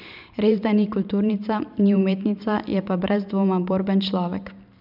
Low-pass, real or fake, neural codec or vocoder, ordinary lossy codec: 5.4 kHz; fake; vocoder, 44.1 kHz, 128 mel bands every 512 samples, BigVGAN v2; none